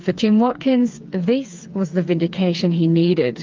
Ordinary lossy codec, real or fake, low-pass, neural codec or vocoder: Opus, 32 kbps; fake; 7.2 kHz; codec, 16 kHz, 4 kbps, FreqCodec, smaller model